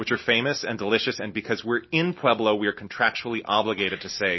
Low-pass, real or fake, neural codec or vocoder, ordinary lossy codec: 7.2 kHz; real; none; MP3, 24 kbps